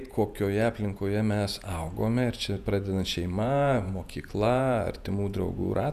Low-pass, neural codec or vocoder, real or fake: 14.4 kHz; vocoder, 48 kHz, 128 mel bands, Vocos; fake